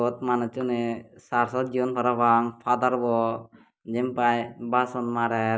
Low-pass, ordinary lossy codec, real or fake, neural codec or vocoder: none; none; real; none